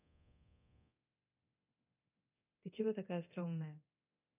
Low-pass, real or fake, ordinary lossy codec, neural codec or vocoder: 3.6 kHz; fake; none; codec, 24 kHz, 0.9 kbps, DualCodec